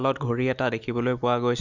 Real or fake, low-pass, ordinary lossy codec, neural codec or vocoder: real; 7.2 kHz; Opus, 64 kbps; none